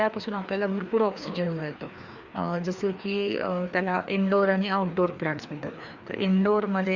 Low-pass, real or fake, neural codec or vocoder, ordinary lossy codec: 7.2 kHz; fake; codec, 16 kHz, 2 kbps, FreqCodec, larger model; Opus, 64 kbps